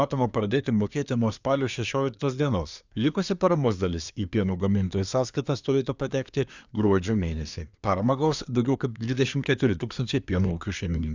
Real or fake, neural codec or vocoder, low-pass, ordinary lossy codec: fake; codec, 24 kHz, 1 kbps, SNAC; 7.2 kHz; Opus, 64 kbps